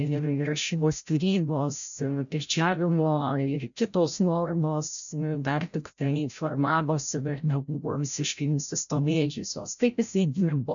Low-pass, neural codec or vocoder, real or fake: 7.2 kHz; codec, 16 kHz, 0.5 kbps, FreqCodec, larger model; fake